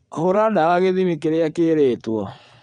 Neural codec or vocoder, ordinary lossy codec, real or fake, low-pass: vocoder, 22.05 kHz, 80 mel bands, WaveNeXt; none; fake; 9.9 kHz